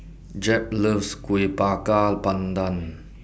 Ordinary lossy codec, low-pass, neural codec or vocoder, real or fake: none; none; none; real